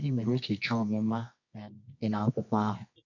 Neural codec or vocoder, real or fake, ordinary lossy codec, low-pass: codec, 24 kHz, 0.9 kbps, WavTokenizer, medium music audio release; fake; none; 7.2 kHz